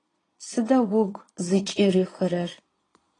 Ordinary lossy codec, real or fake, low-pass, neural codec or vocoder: AAC, 32 kbps; fake; 9.9 kHz; vocoder, 22.05 kHz, 80 mel bands, Vocos